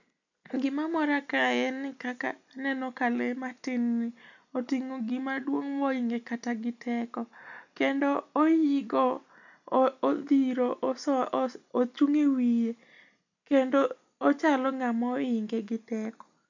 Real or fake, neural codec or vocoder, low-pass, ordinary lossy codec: real; none; 7.2 kHz; none